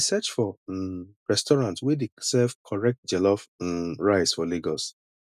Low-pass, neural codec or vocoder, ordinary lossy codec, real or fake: 14.4 kHz; none; none; real